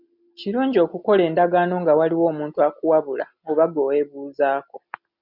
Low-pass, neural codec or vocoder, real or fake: 5.4 kHz; none; real